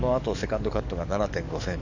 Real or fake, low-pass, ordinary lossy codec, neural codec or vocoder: fake; 7.2 kHz; none; codec, 44.1 kHz, 7.8 kbps, DAC